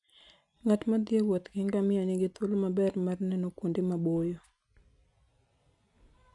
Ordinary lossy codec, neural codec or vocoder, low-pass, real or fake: none; none; 10.8 kHz; real